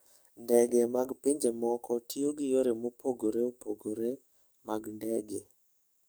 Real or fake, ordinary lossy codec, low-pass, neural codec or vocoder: fake; none; none; codec, 44.1 kHz, 7.8 kbps, DAC